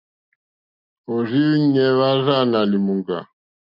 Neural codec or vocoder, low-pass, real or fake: none; 5.4 kHz; real